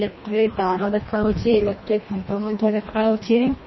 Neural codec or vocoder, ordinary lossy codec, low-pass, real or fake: codec, 24 kHz, 1.5 kbps, HILCodec; MP3, 24 kbps; 7.2 kHz; fake